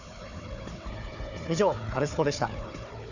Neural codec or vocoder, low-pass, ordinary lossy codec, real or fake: codec, 16 kHz, 4 kbps, FunCodec, trained on Chinese and English, 50 frames a second; 7.2 kHz; none; fake